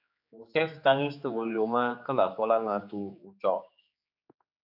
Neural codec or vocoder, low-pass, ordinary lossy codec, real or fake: codec, 16 kHz, 4 kbps, X-Codec, HuBERT features, trained on general audio; 5.4 kHz; AAC, 48 kbps; fake